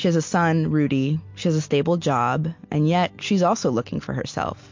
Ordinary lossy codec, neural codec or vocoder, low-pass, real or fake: MP3, 48 kbps; none; 7.2 kHz; real